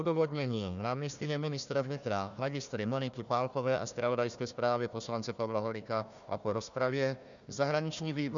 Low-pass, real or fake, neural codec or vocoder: 7.2 kHz; fake; codec, 16 kHz, 1 kbps, FunCodec, trained on Chinese and English, 50 frames a second